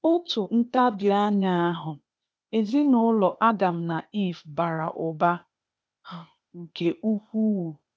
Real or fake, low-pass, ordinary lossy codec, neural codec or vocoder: fake; none; none; codec, 16 kHz, 0.8 kbps, ZipCodec